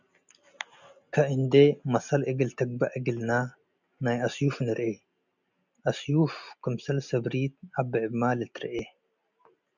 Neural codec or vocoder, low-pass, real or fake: none; 7.2 kHz; real